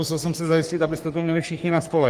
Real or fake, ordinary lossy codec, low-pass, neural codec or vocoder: fake; Opus, 24 kbps; 14.4 kHz; codec, 44.1 kHz, 3.4 kbps, Pupu-Codec